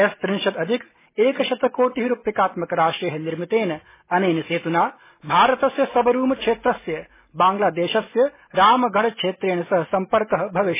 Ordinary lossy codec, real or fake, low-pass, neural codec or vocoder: MP3, 16 kbps; real; 3.6 kHz; none